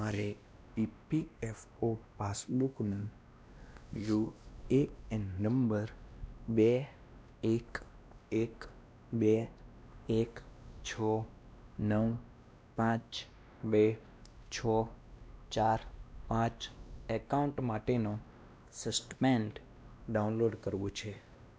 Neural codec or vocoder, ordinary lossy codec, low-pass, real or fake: codec, 16 kHz, 1 kbps, X-Codec, WavLM features, trained on Multilingual LibriSpeech; none; none; fake